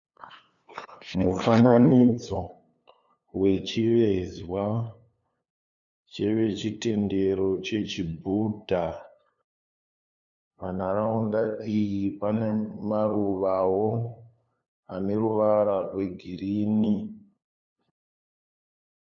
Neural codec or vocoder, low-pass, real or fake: codec, 16 kHz, 2 kbps, FunCodec, trained on LibriTTS, 25 frames a second; 7.2 kHz; fake